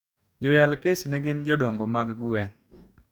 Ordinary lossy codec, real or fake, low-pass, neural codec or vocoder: none; fake; 19.8 kHz; codec, 44.1 kHz, 2.6 kbps, DAC